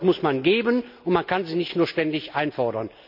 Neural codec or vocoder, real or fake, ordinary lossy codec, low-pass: none; real; none; 5.4 kHz